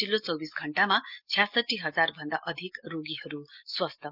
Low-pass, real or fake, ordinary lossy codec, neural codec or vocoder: 5.4 kHz; real; Opus, 24 kbps; none